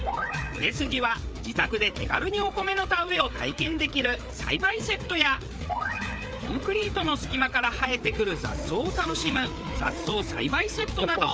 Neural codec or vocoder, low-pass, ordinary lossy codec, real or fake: codec, 16 kHz, 4 kbps, FreqCodec, larger model; none; none; fake